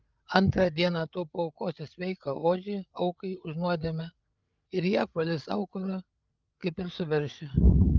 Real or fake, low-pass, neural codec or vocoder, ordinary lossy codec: fake; 7.2 kHz; codec, 16 kHz, 8 kbps, FreqCodec, larger model; Opus, 32 kbps